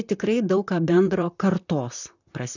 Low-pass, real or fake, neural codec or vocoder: 7.2 kHz; fake; vocoder, 44.1 kHz, 128 mel bands, Pupu-Vocoder